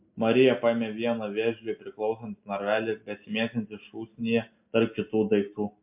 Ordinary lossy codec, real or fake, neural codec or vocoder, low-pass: MP3, 32 kbps; real; none; 3.6 kHz